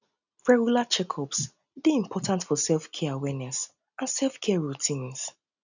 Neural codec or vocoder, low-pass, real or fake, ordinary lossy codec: none; 7.2 kHz; real; none